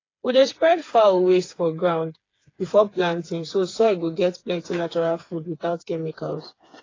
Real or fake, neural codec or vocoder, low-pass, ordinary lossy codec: fake; codec, 16 kHz, 4 kbps, FreqCodec, smaller model; 7.2 kHz; AAC, 32 kbps